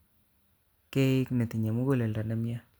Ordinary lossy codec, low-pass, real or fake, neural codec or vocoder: none; none; real; none